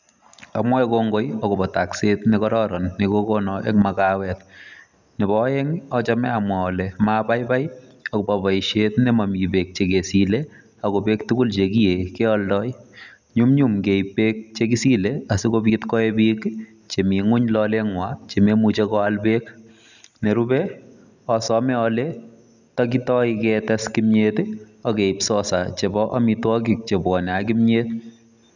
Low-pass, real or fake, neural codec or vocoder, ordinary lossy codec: 7.2 kHz; real; none; none